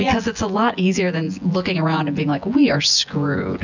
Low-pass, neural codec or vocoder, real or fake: 7.2 kHz; vocoder, 24 kHz, 100 mel bands, Vocos; fake